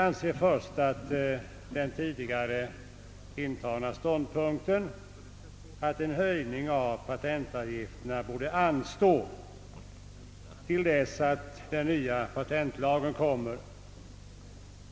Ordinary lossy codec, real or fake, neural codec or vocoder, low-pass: none; real; none; none